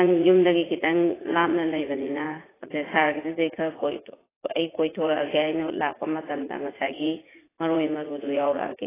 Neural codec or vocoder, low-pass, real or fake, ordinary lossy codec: vocoder, 44.1 kHz, 80 mel bands, Vocos; 3.6 kHz; fake; AAC, 16 kbps